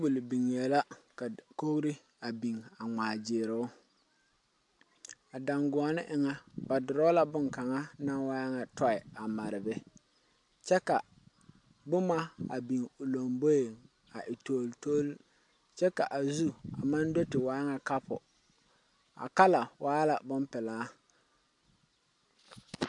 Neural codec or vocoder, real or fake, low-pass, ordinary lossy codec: none; real; 10.8 kHz; MP3, 64 kbps